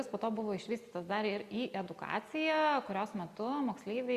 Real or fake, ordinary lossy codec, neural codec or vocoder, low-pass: real; Opus, 64 kbps; none; 14.4 kHz